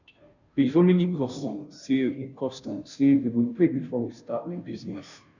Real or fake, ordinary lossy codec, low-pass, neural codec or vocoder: fake; none; 7.2 kHz; codec, 16 kHz, 0.5 kbps, FunCodec, trained on Chinese and English, 25 frames a second